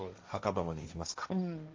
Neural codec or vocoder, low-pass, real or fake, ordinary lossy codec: codec, 16 kHz, 1.1 kbps, Voila-Tokenizer; 7.2 kHz; fake; Opus, 32 kbps